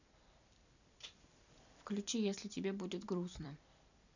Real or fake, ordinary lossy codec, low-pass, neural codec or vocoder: fake; none; 7.2 kHz; vocoder, 44.1 kHz, 128 mel bands every 256 samples, BigVGAN v2